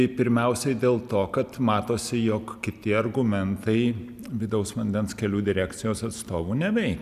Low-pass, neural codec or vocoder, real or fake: 14.4 kHz; none; real